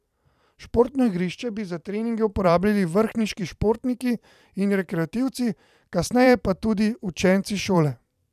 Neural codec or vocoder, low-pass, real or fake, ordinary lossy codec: vocoder, 44.1 kHz, 128 mel bands every 512 samples, BigVGAN v2; 14.4 kHz; fake; none